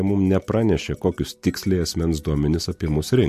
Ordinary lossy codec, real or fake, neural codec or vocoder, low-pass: MP3, 64 kbps; real; none; 14.4 kHz